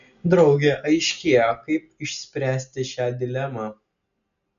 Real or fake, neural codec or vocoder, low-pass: real; none; 7.2 kHz